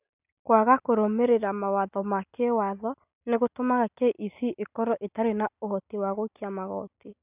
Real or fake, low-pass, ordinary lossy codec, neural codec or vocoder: real; 3.6 kHz; none; none